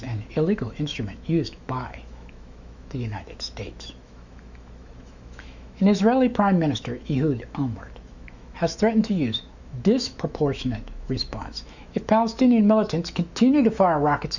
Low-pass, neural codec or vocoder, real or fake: 7.2 kHz; autoencoder, 48 kHz, 128 numbers a frame, DAC-VAE, trained on Japanese speech; fake